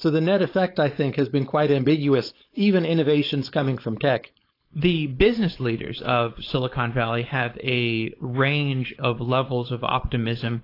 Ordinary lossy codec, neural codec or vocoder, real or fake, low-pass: AAC, 32 kbps; codec, 16 kHz, 4.8 kbps, FACodec; fake; 5.4 kHz